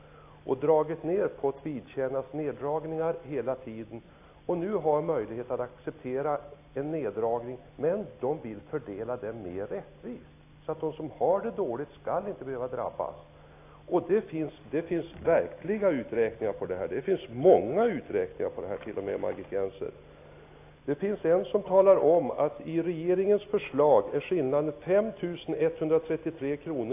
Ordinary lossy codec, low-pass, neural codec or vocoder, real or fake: AAC, 24 kbps; 3.6 kHz; none; real